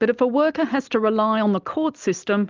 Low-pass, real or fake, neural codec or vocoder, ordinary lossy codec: 7.2 kHz; real; none; Opus, 32 kbps